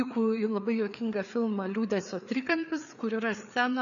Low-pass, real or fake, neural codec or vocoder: 7.2 kHz; fake; codec, 16 kHz, 4 kbps, FreqCodec, larger model